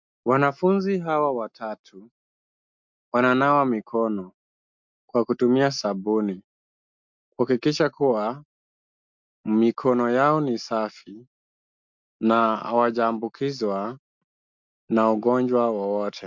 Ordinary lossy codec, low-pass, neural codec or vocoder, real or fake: MP3, 64 kbps; 7.2 kHz; none; real